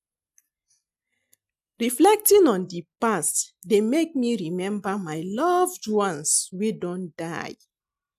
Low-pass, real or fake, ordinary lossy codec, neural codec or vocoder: 14.4 kHz; real; none; none